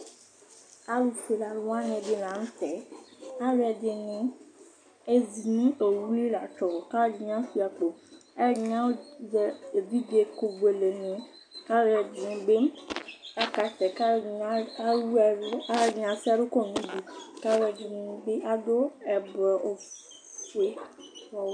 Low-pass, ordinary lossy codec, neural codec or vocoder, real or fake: 9.9 kHz; MP3, 64 kbps; none; real